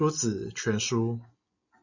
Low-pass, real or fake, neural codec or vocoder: 7.2 kHz; real; none